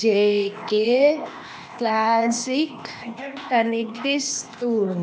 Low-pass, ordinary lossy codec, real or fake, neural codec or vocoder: none; none; fake; codec, 16 kHz, 0.8 kbps, ZipCodec